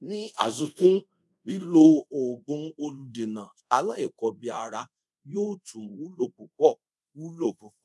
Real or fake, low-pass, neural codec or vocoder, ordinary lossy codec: fake; none; codec, 24 kHz, 0.5 kbps, DualCodec; none